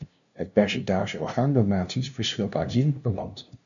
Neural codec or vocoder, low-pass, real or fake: codec, 16 kHz, 0.5 kbps, FunCodec, trained on LibriTTS, 25 frames a second; 7.2 kHz; fake